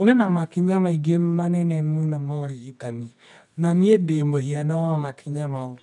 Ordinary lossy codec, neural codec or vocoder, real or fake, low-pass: none; codec, 24 kHz, 0.9 kbps, WavTokenizer, medium music audio release; fake; 10.8 kHz